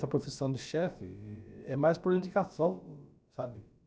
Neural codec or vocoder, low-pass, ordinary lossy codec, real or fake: codec, 16 kHz, about 1 kbps, DyCAST, with the encoder's durations; none; none; fake